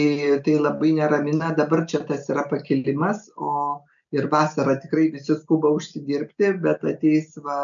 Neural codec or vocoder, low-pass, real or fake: none; 7.2 kHz; real